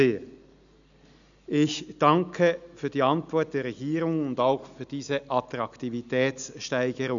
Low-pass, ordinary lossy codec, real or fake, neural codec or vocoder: 7.2 kHz; none; real; none